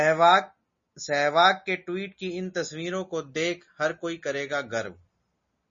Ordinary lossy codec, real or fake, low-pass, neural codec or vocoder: MP3, 32 kbps; real; 7.2 kHz; none